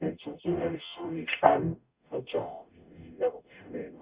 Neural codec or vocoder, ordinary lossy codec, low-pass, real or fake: codec, 44.1 kHz, 0.9 kbps, DAC; Opus, 64 kbps; 3.6 kHz; fake